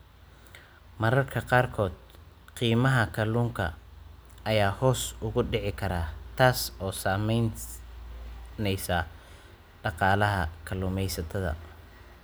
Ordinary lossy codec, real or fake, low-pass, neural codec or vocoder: none; real; none; none